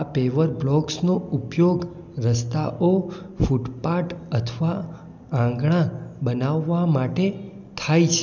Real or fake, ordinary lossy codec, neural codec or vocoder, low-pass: real; none; none; 7.2 kHz